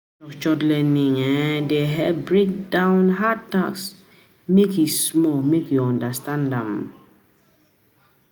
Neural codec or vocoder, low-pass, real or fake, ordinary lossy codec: none; none; real; none